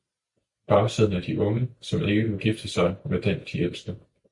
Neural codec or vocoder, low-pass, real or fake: none; 10.8 kHz; real